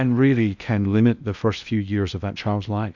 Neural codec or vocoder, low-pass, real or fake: codec, 16 kHz in and 24 kHz out, 0.8 kbps, FocalCodec, streaming, 65536 codes; 7.2 kHz; fake